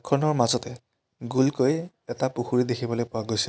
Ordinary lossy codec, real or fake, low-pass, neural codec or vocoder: none; real; none; none